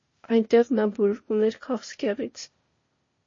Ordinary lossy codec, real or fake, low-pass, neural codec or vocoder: MP3, 32 kbps; fake; 7.2 kHz; codec, 16 kHz, 0.8 kbps, ZipCodec